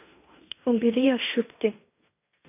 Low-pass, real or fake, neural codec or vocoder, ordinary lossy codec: 3.6 kHz; fake; codec, 16 kHz in and 24 kHz out, 0.9 kbps, LongCat-Audio-Codec, fine tuned four codebook decoder; none